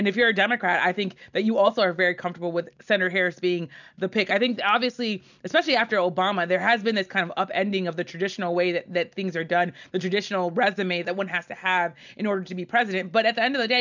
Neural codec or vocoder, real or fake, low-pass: none; real; 7.2 kHz